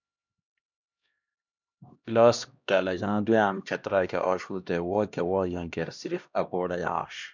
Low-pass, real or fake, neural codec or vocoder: 7.2 kHz; fake; codec, 16 kHz, 1 kbps, X-Codec, HuBERT features, trained on LibriSpeech